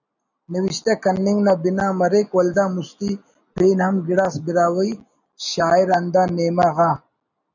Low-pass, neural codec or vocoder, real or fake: 7.2 kHz; none; real